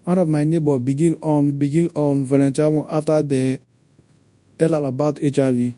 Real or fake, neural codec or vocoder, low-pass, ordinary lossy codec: fake; codec, 24 kHz, 0.9 kbps, WavTokenizer, large speech release; 10.8 kHz; MP3, 64 kbps